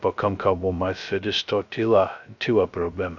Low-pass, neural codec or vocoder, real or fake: 7.2 kHz; codec, 16 kHz, 0.2 kbps, FocalCodec; fake